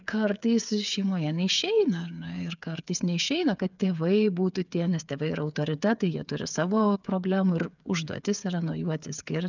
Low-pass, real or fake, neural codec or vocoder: 7.2 kHz; fake; codec, 16 kHz, 8 kbps, FreqCodec, smaller model